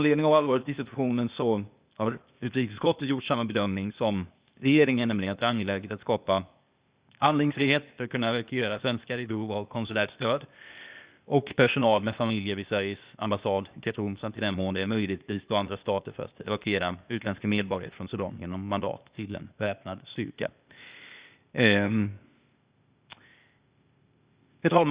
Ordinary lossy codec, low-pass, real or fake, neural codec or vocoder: Opus, 64 kbps; 3.6 kHz; fake; codec, 16 kHz, 0.8 kbps, ZipCodec